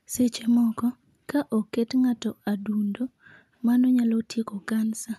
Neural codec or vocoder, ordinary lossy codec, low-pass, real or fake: none; none; 14.4 kHz; real